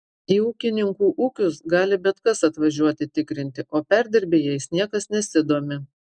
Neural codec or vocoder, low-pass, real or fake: none; 9.9 kHz; real